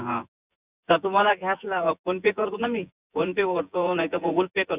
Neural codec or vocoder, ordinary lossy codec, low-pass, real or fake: vocoder, 24 kHz, 100 mel bands, Vocos; Opus, 64 kbps; 3.6 kHz; fake